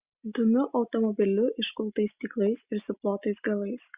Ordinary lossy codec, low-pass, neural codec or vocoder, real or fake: Opus, 64 kbps; 3.6 kHz; none; real